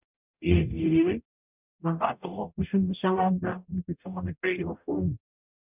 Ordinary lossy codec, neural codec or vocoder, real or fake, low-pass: none; codec, 44.1 kHz, 0.9 kbps, DAC; fake; 3.6 kHz